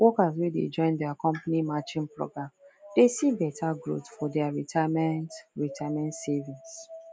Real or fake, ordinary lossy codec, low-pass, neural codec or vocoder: real; none; none; none